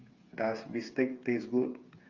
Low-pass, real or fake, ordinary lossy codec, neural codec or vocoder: 7.2 kHz; fake; Opus, 32 kbps; codec, 16 kHz, 8 kbps, FreqCodec, smaller model